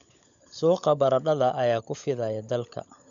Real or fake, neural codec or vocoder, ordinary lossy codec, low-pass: fake; codec, 16 kHz, 16 kbps, FunCodec, trained on LibriTTS, 50 frames a second; none; 7.2 kHz